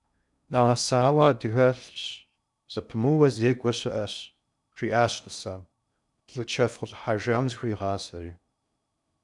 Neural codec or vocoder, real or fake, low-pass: codec, 16 kHz in and 24 kHz out, 0.6 kbps, FocalCodec, streaming, 2048 codes; fake; 10.8 kHz